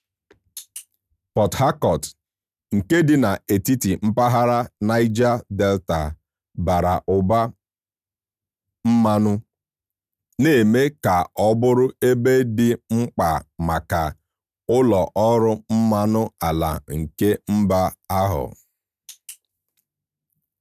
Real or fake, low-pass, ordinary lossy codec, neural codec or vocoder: real; 14.4 kHz; none; none